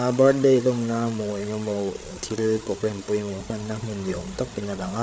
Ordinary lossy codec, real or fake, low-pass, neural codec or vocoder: none; fake; none; codec, 16 kHz, 8 kbps, FreqCodec, larger model